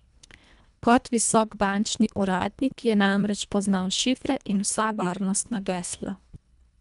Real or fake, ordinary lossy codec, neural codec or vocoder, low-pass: fake; none; codec, 24 kHz, 1.5 kbps, HILCodec; 10.8 kHz